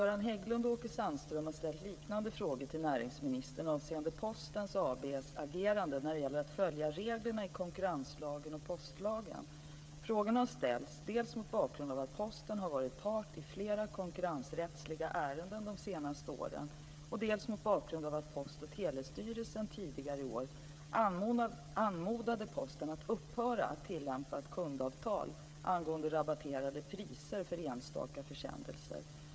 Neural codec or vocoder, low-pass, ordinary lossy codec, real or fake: codec, 16 kHz, 16 kbps, FreqCodec, smaller model; none; none; fake